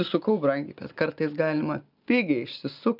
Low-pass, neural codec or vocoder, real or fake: 5.4 kHz; none; real